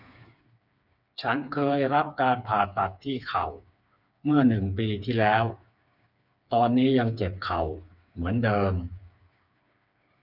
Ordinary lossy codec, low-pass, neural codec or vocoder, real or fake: none; 5.4 kHz; codec, 16 kHz, 4 kbps, FreqCodec, smaller model; fake